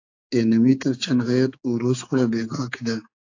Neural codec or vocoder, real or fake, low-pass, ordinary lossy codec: codec, 16 kHz, 4 kbps, X-Codec, HuBERT features, trained on general audio; fake; 7.2 kHz; AAC, 48 kbps